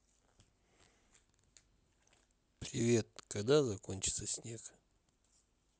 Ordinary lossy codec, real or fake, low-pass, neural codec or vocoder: none; real; none; none